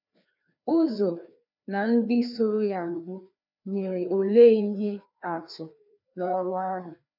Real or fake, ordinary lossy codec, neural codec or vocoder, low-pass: fake; none; codec, 16 kHz, 2 kbps, FreqCodec, larger model; 5.4 kHz